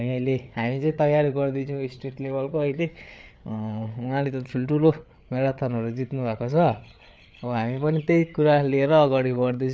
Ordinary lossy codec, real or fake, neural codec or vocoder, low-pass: none; fake; codec, 16 kHz, 8 kbps, FreqCodec, larger model; none